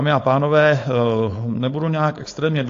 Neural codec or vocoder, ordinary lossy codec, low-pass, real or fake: codec, 16 kHz, 4.8 kbps, FACodec; AAC, 48 kbps; 7.2 kHz; fake